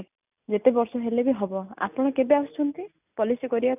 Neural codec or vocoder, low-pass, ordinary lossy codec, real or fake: none; 3.6 kHz; none; real